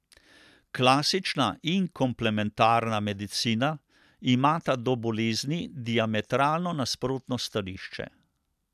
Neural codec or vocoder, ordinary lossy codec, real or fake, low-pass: none; none; real; 14.4 kHz